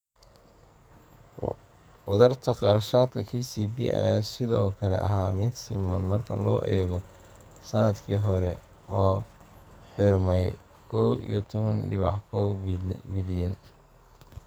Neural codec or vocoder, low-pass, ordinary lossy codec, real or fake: codec, 44.1 kHz, 2.6 kbps, SNAC; none; none; fake